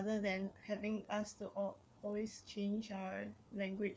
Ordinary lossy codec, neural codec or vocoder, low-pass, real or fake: none; codec, 16 kHz, 4 kbps, FreqCodec, larger model; none; fake